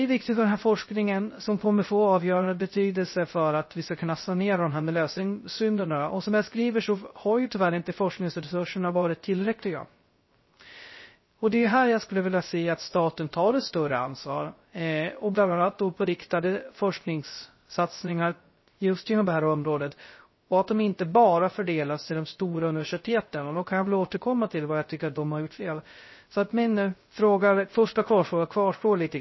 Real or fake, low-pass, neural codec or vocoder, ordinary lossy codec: fake; 7.2 kHz; codec, 16 kHz, 0.3 kbps, FocalCodec; MP3, 24 kbps